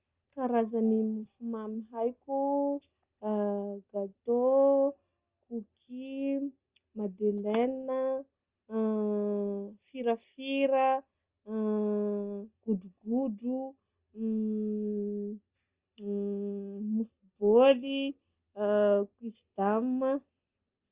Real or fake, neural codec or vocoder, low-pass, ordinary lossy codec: real; none; 3.6 kHz; Opus, 24 kbps